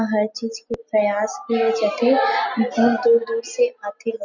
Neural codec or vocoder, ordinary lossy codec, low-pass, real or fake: none; none; 7.2 kHz; real